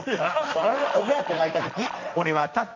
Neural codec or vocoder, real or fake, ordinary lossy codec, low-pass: codec, 16 kHz, 1.1 kbps, Voila-Tokenizer; fake; none; 7.2 kHz